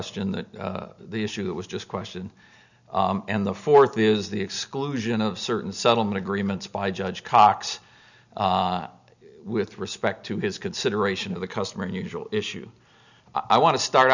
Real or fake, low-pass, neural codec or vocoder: real; 7.2 kHz; none